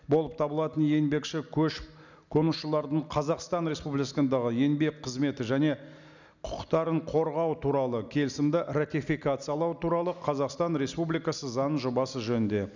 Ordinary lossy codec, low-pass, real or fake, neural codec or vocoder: none; 7.2 kHz; real; none